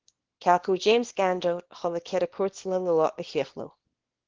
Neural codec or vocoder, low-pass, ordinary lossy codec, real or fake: codec, 24 kHz, 0.9 kbps, WavTokenizer, small release; 7.2 kHz; Opus, 16 kbps; fake